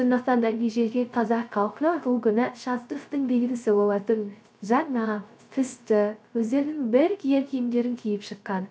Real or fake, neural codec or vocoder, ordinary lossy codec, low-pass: fake; codec, 16 kHz, 0.3 kbps, FocalCodec; none; none